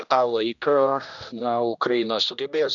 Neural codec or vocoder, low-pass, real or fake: codec, 16 kHz, 1 kbps, X-Codec, HuBERT features, trained on general audio; 7.2 kHz; fake